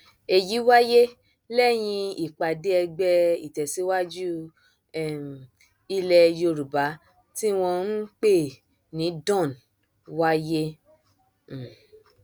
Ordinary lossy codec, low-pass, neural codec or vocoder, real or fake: none; none; none; real